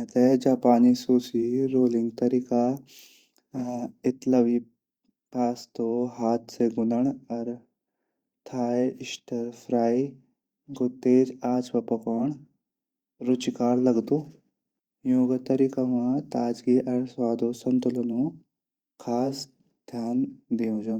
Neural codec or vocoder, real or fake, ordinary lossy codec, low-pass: vocoder, 44.1 kHz, 128 mel bands every 512 samples, BigVGAN v2; fake; Opus, 64 kbps; 19.8 kHz